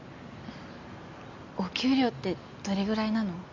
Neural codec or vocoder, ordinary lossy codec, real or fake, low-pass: none; MP3, 48 kbps; real; 7.2 kHz